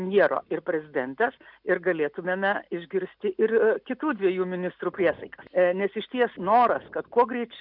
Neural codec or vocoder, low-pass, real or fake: none; 5.4 kHz; real